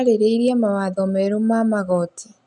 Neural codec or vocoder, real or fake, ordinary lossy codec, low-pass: none; real; none; 10.8 kHz